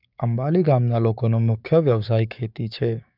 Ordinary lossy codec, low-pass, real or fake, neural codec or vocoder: none; 5.4 kHz; fake; codec, 16 kHz, 6 kbps, DAC